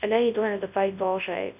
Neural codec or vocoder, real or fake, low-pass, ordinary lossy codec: codec, 24 kHz, 0.9 kbps, WavTokenizer, large speech release; fake; 3.6 kHz; none